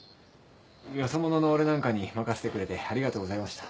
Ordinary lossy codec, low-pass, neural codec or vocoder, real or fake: none; none; none; real